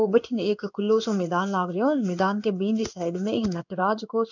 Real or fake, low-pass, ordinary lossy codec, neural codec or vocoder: fake; 7.2 kHz; none; codec, 16 kHz in and 24 kHz out, 1 kbps, XY-Tokenizer